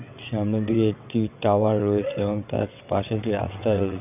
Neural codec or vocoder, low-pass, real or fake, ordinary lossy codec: vocoder, 22.05 kHz, 80 mel bands, WaveNeXt; 3.6 kHz; fake; none